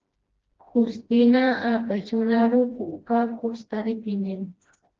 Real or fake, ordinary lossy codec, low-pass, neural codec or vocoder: fake; Opus, 16 kbps; 7.2 kHz; codec, 16 kHz, 1 kbps, FreqCodec, smaller model